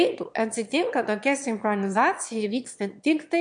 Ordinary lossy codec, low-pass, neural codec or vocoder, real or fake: MP3, 64 kbps; 9.9 kHz; autoencoder, 22.05 kHz, a latent of 192 numbers a frame, VITS, trained on one speaker; fake